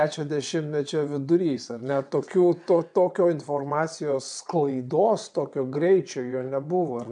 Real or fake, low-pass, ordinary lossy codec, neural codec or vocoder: fake; 9.9 kHz; MP3, 96 kbps; vocoder, 22.05 kHz, 80 mel bands, WaveNeXt